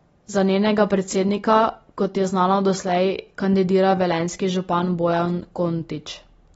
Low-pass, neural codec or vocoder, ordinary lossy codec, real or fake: 10.8 kHz; none; AAC, 24 kbps; real